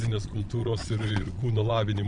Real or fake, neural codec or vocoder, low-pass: fake; vocoder, 22.05 kHz, 80 mel bands, Vocos; 9.9 kHz